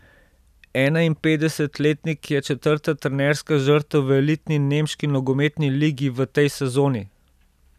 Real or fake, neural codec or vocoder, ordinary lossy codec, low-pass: real; none; none; 14.4 kHz